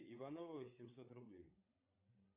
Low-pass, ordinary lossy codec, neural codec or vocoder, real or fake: 3.6 kHz; AAC, 32 kbps; codec, 16 kHz, 8 kbps, FreqCodec, larger model; fake